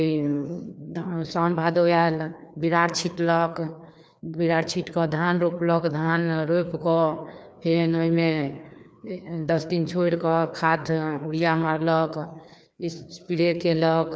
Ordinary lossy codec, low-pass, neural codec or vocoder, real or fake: none; none; codec, 16 kHz, 2 kbps, FreqCodec, larger model; fake